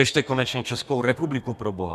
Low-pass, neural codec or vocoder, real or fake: 14.4 kHz; codec, 32 kHz, 1.9 kbps, SNAC; fake